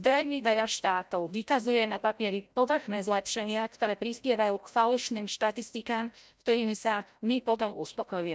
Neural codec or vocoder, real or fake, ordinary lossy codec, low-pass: codec, 16 kHz, 0.5 kbps, FreqCodec, larger model; fake; none; none